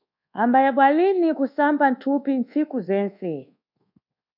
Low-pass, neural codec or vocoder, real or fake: 5.4 kHz; codec, 24 kHz, 1.2 kbps, DualCodec; fake